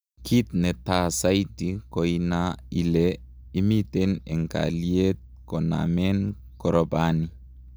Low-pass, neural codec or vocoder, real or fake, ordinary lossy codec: none; none; real; none